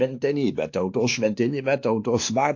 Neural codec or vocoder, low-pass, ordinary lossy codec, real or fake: codec, 16 kHz, 2 kbps, X-Codec, WavLM features, trained on Multilingual LibriSpeech; 7.2 kHz; AAC, 48 kbps; fake